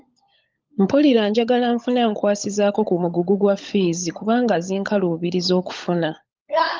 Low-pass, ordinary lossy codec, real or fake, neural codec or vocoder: 7.2 kHz; Opus, 32 kbps; fake; codec, 16 kHz, 16 kbps, FunCodec, trained on LibriTTS, 50 frames a second